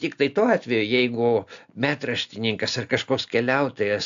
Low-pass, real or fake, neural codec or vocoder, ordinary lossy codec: 7.2 kHz; real; none; AAC, 64 kbps